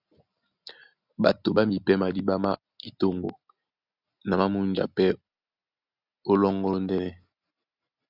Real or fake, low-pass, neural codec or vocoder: real; 5.4 kHz; none